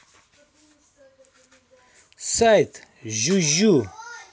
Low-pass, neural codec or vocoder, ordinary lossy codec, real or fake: none; none; none; real